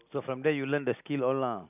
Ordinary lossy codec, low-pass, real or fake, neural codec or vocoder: none; 3.6 kHz; real; none